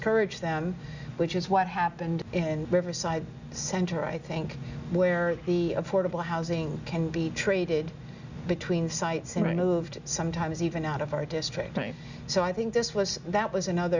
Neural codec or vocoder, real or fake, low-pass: none; real; 7.2 kHz